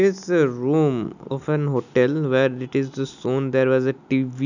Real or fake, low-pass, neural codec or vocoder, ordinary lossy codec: real; 7.2 kHz; none; none